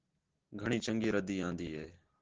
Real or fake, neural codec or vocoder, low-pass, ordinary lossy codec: real; none; 7.2 kHz; Opus, 16 kbps